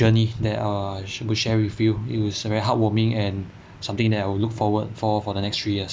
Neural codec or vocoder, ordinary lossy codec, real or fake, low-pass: none; none; real; none